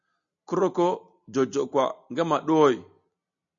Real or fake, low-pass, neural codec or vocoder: real; 7.2 kHz; none